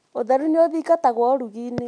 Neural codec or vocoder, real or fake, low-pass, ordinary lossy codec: none; real; 9.9 kHz; none